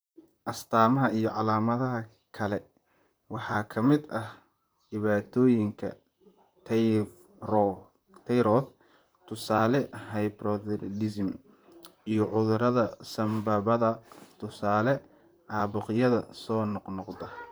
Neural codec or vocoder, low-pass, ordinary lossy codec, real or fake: vocoder, 44.1 kHz, 128 mel bands, Pupu-Vocoder; none; none; fake